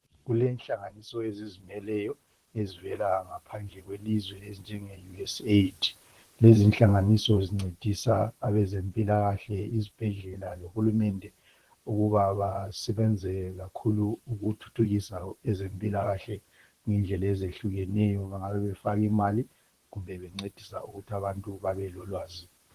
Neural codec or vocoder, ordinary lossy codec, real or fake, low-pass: vocoder, 44.1 kHz, 128 mel bands, Pupu-Vocoder; Opus, 16 kbps; fake; 14.4 kHz